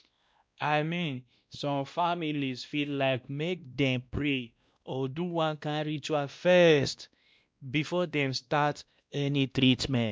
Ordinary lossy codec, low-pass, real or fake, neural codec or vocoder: none; none; fake; codec, 16 kHz, 1 kbps, X-Codec, WavLM features, trained on Multilingual LibriSpeech